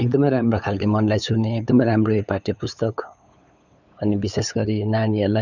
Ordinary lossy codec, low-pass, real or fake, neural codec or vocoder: none; 7.2 kHz; fake; codec, 16 kHz, 8 kbps, FunCodec, trained on LibriTTS, 25 frames a second